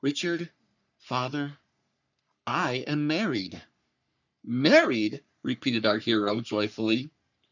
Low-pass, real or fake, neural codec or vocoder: 7.2 kHz; fake; codec, 44.1 kHz, 3.4 kbps, Pupu-Codec